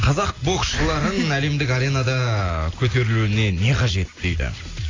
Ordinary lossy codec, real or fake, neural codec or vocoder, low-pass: AAC, 32 kbps; real; none; 7.2 kHz